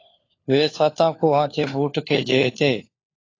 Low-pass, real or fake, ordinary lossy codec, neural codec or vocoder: 7.2 kHz; fake; AAC, 32 kbps; codec, 16 kHz, 4 kbps, FunCodec, trained on LibriTTS, 50 frames a second